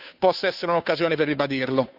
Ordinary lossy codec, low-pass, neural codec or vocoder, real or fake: none; 5.4 kHz; codec, 16 kHz, 2 kbps, FunCodec, trained on Chinese and English, 25 frames a second; fake